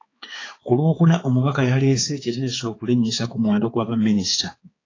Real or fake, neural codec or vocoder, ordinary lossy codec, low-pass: fake; codec, 16 kHz, 4 kbps, X-Codec, HuBERT features, trained on balanced general audio; AAC, 32 kbps; 7.2 kHz